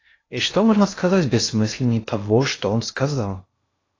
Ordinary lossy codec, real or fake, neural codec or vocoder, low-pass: AAC, 32 kbps; fake; codec, 16 kHz in and 24 kHz out, 0.6 kbps, FocalCodec, streaming, 2048 codes; 7.2 kHz